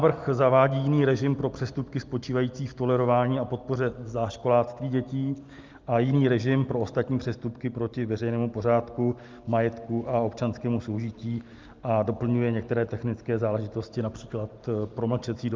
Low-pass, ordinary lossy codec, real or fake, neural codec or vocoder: 7.2 kHz; Opus, 24 kbps; real; none